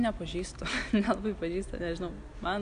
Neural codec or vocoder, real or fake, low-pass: none; real; 9.9 kHz